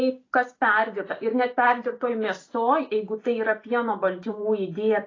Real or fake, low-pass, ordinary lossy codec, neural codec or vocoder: real; 7.2 kHz; AAC, 32 kbps; none